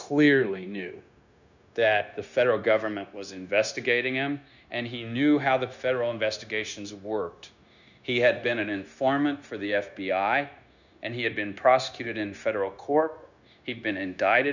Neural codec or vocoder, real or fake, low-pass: codec, 16 kHz, 0.9 kbps, LongCat-Audio-Codec; fake; 7.2 kHz